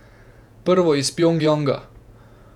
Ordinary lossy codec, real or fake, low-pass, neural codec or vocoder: none; fake; 19.8 kHz; vocoder, 48 kHz, 128 mel bands, Vocos